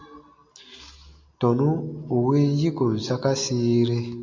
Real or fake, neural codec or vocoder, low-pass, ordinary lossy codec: real; none; 7.2 kHz; MP3, 64 kbps